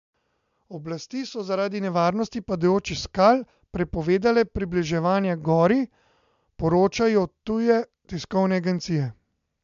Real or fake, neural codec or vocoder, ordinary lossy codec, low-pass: real; none; MP3, 64 kbps; 7.2 kHz